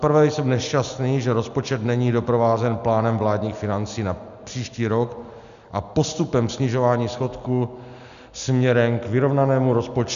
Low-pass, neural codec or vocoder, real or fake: 7.2 kHz; none; real